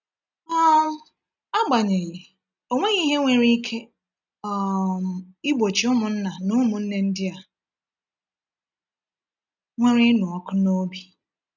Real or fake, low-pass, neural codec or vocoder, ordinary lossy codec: real; 7.2 kHz; none; none